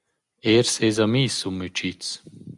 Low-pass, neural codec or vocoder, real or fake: 10.8 kHz; none; real